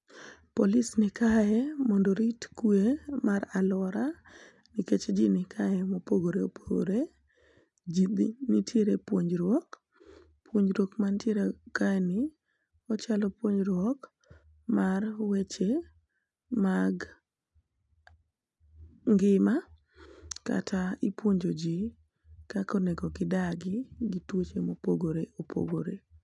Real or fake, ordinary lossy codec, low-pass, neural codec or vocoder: real; none; 10.8 kHz; none